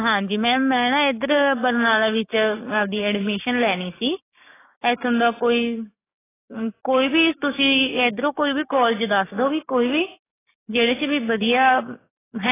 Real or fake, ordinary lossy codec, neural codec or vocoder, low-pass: fake; AAC, 16 kbps; codec, 16 kHz, 6 kbps, DAC; 3.6 kHz